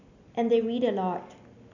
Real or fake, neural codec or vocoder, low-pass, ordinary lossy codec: real; none; 7.2 kHz; none